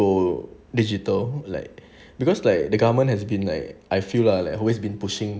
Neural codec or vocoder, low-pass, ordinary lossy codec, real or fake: none; none; none; real